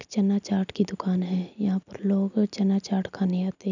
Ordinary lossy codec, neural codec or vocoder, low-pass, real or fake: none; none; 7.2 kHz; real